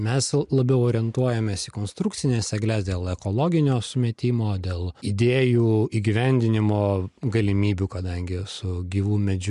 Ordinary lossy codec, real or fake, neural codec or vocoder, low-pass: MP3, 64 kbps; real; none; 10.8 kHz